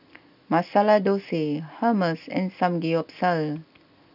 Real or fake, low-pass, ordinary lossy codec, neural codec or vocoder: real; 5.4 kHz; none; none